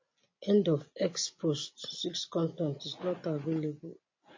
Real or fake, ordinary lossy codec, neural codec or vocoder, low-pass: real; MP3, 32 kbps; none; 7.2 kHz